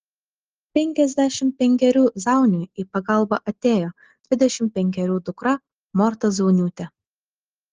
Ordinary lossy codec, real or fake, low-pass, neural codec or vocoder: Opus, 16 kbps; real; 7.2 kHz; none